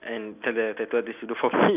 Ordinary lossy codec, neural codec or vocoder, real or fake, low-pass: none; none; real; 3.6 kHz